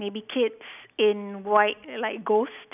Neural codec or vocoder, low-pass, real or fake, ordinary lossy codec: none; 3.6 kHz; real; none